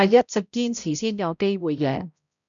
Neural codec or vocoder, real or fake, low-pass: codec, 16 kHz, 0.5 kbps, X-Codec, HuBERT features, trained on balanced general audio; fake; 7.2 kHz